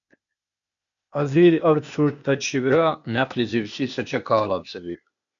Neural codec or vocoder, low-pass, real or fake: codec, 16 kHz, 0.8 kbps, ZipCodec; 7.2 kHz; fake